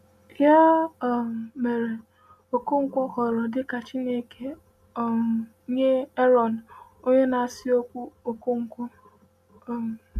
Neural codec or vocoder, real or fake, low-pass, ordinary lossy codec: none; real; 14.4 kHz; none